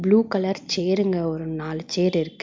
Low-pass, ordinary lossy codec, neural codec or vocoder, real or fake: 7.2 kHz; MP3, 64 kbps; none; real